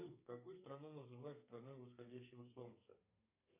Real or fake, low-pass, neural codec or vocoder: fake; 3.6 kHz; codec, 32 kHz, 1.9 kbps, SNAC